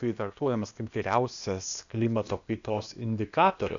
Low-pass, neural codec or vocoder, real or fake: 7.2 kHz; codec, 16 kHz, 0.8 kbps, ZipCodec; fake